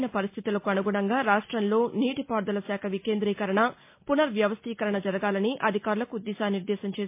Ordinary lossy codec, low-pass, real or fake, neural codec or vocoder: MP3, 24 kbps; 3.6 kHz; real; none